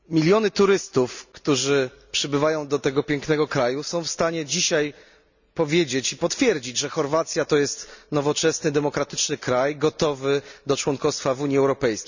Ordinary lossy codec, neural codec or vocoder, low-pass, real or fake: none; none; 7.2 kHz; real